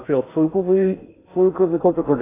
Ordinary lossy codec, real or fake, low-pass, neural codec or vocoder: AAC, 16 kbps; fake; 3.6 kHz; codec, 16 kHz in and 24 kHz out, 0.6 kbps, FocalCodec, streaming, 2048 codes